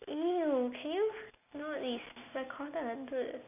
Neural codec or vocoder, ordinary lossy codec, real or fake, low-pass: none; none; real; 3.6 kHz